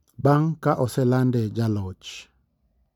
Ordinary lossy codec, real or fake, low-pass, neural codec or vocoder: none; real; 19.8 kHz; none